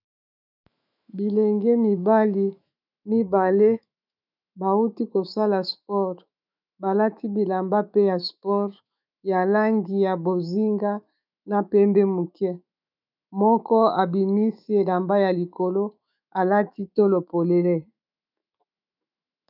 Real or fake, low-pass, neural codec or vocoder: fake; 5.4 kHz; autoencoder, 48 kHz, 128 numbers a frame, DAC-VAE, trained on Japanese speech